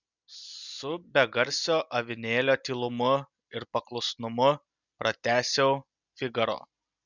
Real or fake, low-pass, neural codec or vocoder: real; 7.2 kHz; none